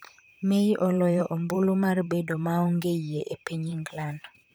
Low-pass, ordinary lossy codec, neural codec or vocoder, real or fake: none; none; vocoder, 44.1 kHz, 128 mel bands, Pupu-Vocoder; fake